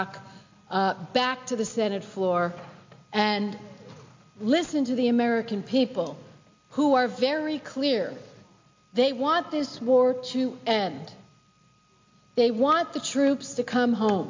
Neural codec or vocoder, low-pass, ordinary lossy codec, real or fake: none; 7.2 kHz; MP3, 48 kbps; real